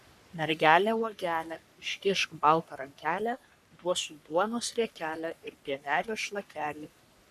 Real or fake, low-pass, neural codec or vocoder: fake; 14.4 kHz; codec, 44.1 kHz, 3.4 kbps, Pupu-Codec